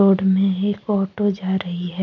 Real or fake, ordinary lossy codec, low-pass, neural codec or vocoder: real; none; 7.2 kHz; none